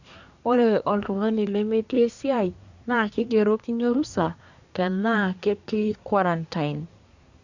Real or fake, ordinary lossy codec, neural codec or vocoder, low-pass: fake; none; codec, 24 kHz, 1 kbps, SNAC; 7.2 kHz